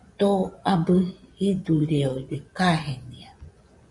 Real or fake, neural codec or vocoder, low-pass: fake; vocoder, 24 kHz, 100 mel bands, Vocos; 10.8 kHz